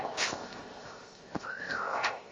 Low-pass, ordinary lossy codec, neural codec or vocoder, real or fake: 7.2 kHz; Opus, 32 kbps; codec, 16 kHz, 0.7 kbps, FocalCodec; fake